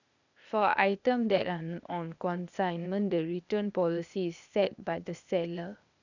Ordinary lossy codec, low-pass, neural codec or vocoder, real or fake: none; 7.2 kHz; codec, 16 kHz, 0.8 kbps, ZipCodec; fake